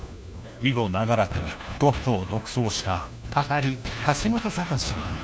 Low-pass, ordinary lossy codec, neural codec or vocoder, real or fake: none; none; codec, 16 kHz, 1 kbps, FunCodec, trained on LibriTTS, 50 frames a second; fake